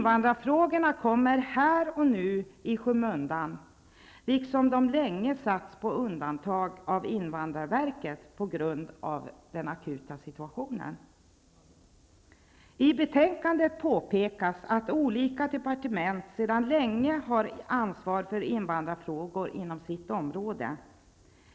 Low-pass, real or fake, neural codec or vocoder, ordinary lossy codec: none; real; none; none